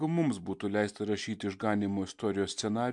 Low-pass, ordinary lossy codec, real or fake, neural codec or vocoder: 10.8 kHz; MP3, 64 kbps; real; none